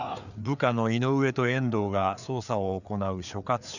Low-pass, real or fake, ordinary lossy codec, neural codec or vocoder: 7.2 kHz; fake; none; codec, 16 kHz, 4 kbps, FreqCodec, larger model